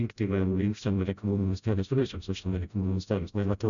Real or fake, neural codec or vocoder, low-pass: fake; codec, 16 kHz, 0.5 kbps, FreqCodec, smaller model; 7.2 kHz